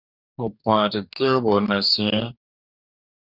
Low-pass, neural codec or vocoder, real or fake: 5.4 kHz; codec, 44.1 kHz, 2.6 kbps, DAC; fake